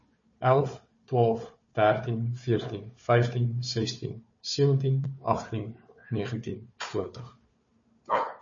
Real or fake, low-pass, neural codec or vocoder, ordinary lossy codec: fake; 7.2 kHz; codec, 16 kHz, 4 kbps, FunCodec, trained on Chinese and English, 50 frames a second; MP3, 32 kbps